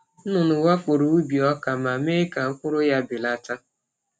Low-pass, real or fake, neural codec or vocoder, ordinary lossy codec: none; real; none; none